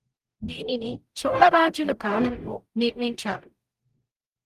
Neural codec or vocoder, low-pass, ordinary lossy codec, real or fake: codec, 44.1 kHz, 0.9 kbps, DAC; 14.4 kHz; Opus, 32 kbps; fake